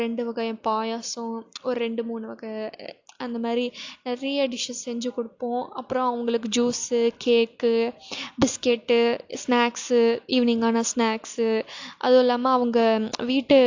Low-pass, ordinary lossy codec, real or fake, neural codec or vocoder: 7.2 kHz; AAC, 48 kbps; real; none